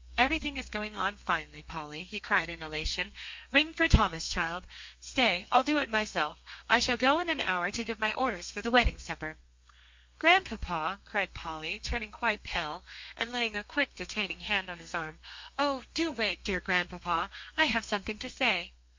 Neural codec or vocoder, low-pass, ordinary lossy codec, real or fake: codec, 32 kHz, 1.9 kbps, SNAC; 7.2 kHz; MP3, 48 kbps; fake